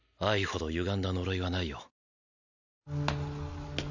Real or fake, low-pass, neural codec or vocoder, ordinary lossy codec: real; 7.2 kHz; none; none